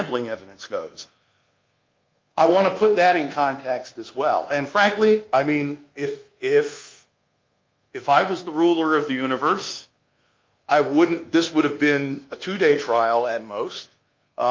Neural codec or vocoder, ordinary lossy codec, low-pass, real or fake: codec, 24 kHz, 1.2 kbps, DualCodec; Opus, 24 kbps; 7.2 kHz; fake